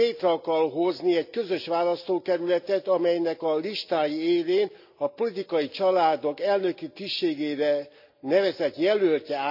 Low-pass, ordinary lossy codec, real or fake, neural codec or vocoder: 5.4 kHz; none; real; none